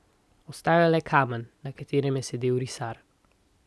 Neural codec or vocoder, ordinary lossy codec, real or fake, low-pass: none; none; real; none